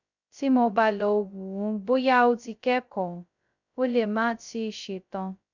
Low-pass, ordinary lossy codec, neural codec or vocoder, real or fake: 7.2 kHz; none; codec, 16 kHz, 0.2 kbps, FocalCodec; fake